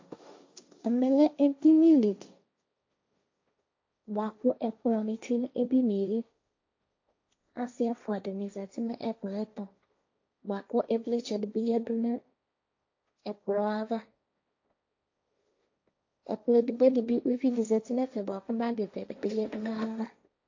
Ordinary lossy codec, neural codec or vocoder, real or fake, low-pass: AAC, 48 kbps; codec, 16 kHz, 1.1 kbps, Voila-Tokenizer; fake; 7.2 kHz